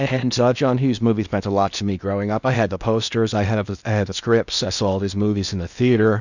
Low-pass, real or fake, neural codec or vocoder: 7.2 kHz; fake; codec, 16 kHz in and 24 kHz out, 0.8 kbps, FocalCodec, streaming, 65536 codes